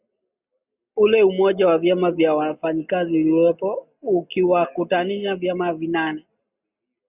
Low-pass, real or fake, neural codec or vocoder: 3.6 kHz; real; none